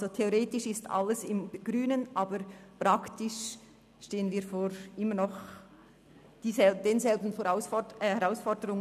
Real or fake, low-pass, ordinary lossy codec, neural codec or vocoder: real; 14.4 kHz; none; none